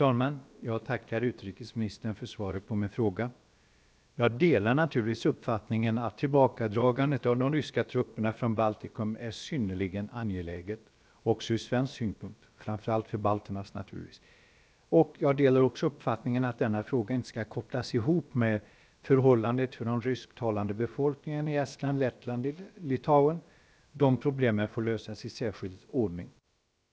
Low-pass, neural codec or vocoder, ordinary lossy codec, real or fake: none; codec, 16 kHz, about 1 kbps, DyCAST, with the encoder's durations; none; fake